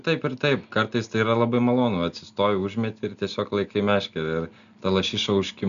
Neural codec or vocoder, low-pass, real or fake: none; 7.2 kHz; real